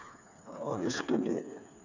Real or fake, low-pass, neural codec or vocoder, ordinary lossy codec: fake; 7.2 kHz; codec, 16 kHz, 4 kbps, FreqCodec, smaller model; none